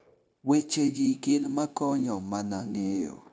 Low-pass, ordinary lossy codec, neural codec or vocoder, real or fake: none; none; codec, 16 kHz, 0.9 kbps, LongCat-Audio-Codec; fake